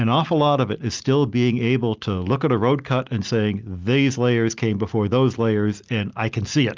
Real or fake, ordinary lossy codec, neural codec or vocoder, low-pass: real; Opus, 24 kbps; none; 7.2 kHz